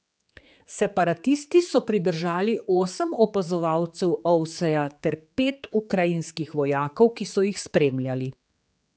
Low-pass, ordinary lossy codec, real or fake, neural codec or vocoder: none; none; fake; codec, 16 kHz, 4 kbps, X-Codec, HuBERT features, trained on general audio